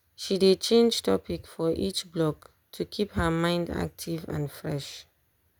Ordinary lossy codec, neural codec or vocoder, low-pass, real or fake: none; none; none; real